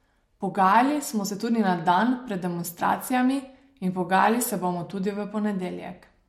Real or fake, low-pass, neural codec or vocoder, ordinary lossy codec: real; 19.8 kHz; none; MP3, 64 kbps